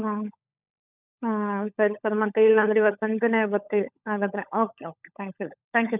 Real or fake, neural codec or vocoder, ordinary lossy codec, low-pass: fake; codec, 16 kHz, 16 kbps, FunCodec, trained on LibriTTS, 50 frames a second; none; 3.6 kHz